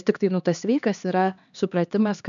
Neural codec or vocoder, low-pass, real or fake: codec, 16 kHz, 2 kbps, X-Codec, HuBERT features, trained on LibriSpeech; 7.2 kHz; fake